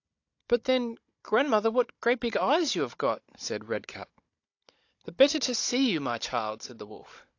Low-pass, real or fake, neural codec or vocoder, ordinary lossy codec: 7.2 kHz; fake; codec, 16 kHz, 16 kbps, FunCodec, trained on Chinese and English, 50 frames a second; AAC, 48 kbps